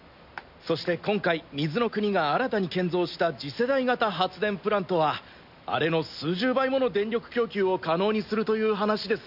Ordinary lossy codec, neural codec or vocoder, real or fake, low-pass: none; none; real; 5.4 kHz